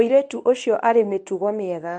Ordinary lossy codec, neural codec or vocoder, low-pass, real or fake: MP3, 64 kbps; codec, 24 kHz, 0.9 kbps, WavTokenizer, medium speech release version 1; 9.9 kHz; fake